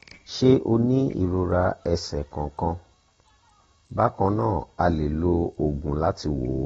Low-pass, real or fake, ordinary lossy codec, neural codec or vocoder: 19.8 kHz; real; AAC, 24 kbps; none